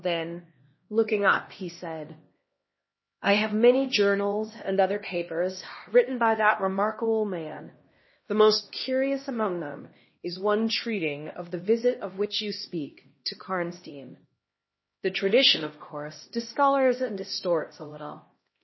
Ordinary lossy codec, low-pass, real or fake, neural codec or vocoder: MP3, 24 kbps; 7.2 kHz; fake; codec, 16 kHz, 1 kbps, X-Codec, HuBERT features, trained on LibriSpeech